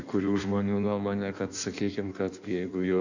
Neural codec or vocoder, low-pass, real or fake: codec, 16 kHz in and 24 kHz out, 1.1 kbps, FireRedTTS-2 codec; 7.2 kHz; fake